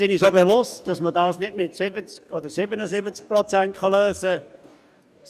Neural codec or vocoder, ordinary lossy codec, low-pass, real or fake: codec, 44.1 kHz, 2.6 kbps, DAC; none; 14.4 kHz; fake